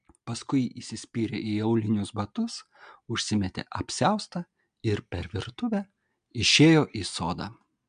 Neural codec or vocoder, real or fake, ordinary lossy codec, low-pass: none; real; MP3, 64 kbps; 9.9 kHz